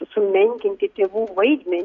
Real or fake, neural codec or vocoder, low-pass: real; none; 7.2 kHz